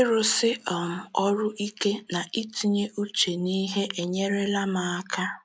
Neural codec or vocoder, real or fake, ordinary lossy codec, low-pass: none; real; none; none